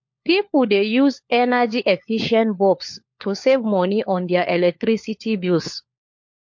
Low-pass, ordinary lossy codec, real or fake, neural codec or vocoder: 7.2 kHz; MP3, 48 kbps; fake; codec, 16 kHz, 4 kbps, FunCodec, trained on LibriTTS, 50 frames a second